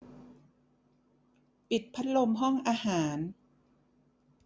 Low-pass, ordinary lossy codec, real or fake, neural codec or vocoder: none; none; real; none